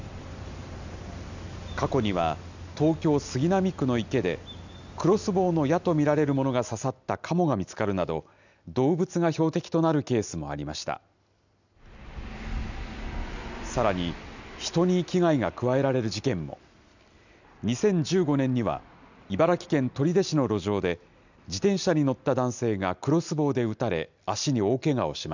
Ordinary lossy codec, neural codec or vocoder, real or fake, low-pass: none; none; real; 7.2 kHz